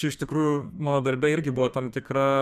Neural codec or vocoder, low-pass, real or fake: codec, 32 kHz, 1.9 kbps, SNAC; 14.4 kHz; fake